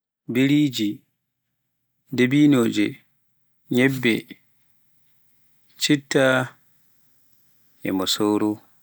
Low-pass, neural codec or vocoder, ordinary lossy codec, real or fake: none; none; none; real